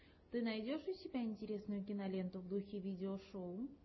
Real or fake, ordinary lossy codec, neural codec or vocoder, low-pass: real; MP3, 24 kbps; none; 7.2 kHz